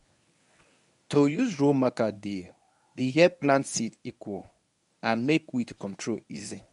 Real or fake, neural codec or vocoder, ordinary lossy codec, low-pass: fake; codec, 24 kHz, 0.9 kbps, WavTokenizer, medium speech release version 1; none; 10.8 kHz